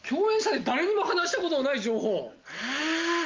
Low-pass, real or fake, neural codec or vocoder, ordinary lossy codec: 7.2 kHz; real; none; Opus, 24 kbps